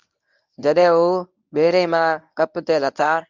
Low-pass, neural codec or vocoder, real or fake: 7.2 kHz; codec, 24 kHz, 0.9 kbps, WavTokenizer, medium speech release version 1; fake